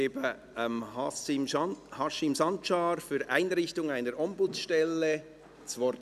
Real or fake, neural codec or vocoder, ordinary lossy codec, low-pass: real; none; none; 14.4 kHz